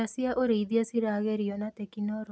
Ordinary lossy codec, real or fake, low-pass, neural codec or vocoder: none; real; none; none